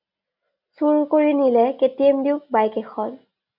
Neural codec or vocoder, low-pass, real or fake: none; 5.4 kHz; real